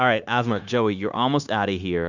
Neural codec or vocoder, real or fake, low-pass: codec, 16 kHz, 0.9 kbps, LongCat-Audio-Codec; fake; 7.2 kHz